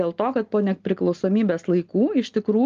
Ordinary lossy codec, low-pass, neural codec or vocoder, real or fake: Opus, 32 kbps; 7.2 kHz; none; real